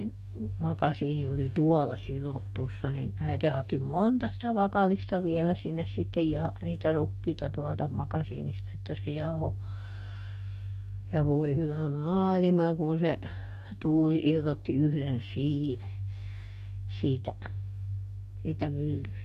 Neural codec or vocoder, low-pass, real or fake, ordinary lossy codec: codec, 44.1 kHz, 2.6 kbps, DAC; 14.4 kHz; fake; none